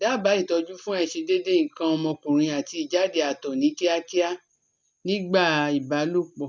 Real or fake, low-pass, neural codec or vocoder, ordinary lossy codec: real; none; none; none